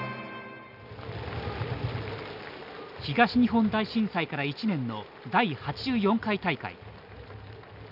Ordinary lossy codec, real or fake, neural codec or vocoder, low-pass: none; real; none; 5.4 kHz